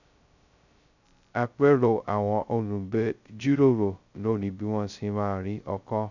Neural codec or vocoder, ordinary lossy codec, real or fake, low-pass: codec, 16 kHz, 0.2 kbps, FocalCodec; none; fake; 7.2 kHz